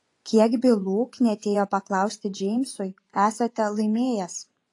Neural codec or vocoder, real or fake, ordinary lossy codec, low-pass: vocoder, 24 kHz, 100 mel bands, Vocos; fake; AAC, 48 kbps; 10.8 kHz